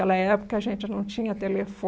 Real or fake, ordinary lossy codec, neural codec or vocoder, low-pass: real; none; none; none